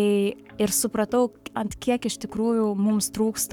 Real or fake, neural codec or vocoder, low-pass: fake; codec, 44.1 kHz, 7.8 kbps, Pupu-Codec; 19.8 kHz